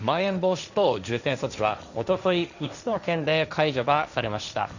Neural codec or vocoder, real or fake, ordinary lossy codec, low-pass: codec, 16 kHz, 1.1 kbps, Voila-Tokenizer; fake; none; 7.2 kHz